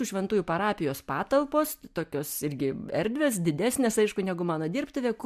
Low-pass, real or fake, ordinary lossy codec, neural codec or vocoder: 14.4 kHz; real; MP3, 96 kbps; none